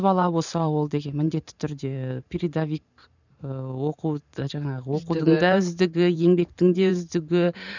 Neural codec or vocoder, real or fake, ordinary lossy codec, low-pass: vocoder, 44.1 kHz, 128 mel bands every 512 samples, BigVGAN v2; fake; none; 7.2 kHz